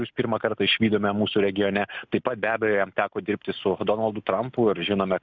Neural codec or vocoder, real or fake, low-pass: none; real; 7.2 kHz